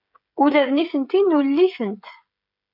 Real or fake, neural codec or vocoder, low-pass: fake; codec, 16 kHz, 16 kbps, FreqCodec, smaller model; 5.4 kHz